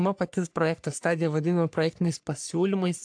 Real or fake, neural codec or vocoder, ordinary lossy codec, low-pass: fake; codec, 44.1 kHz, 3.4 kbps, Pupu-Codec; AAC, 64 kbps; 9.9 kHz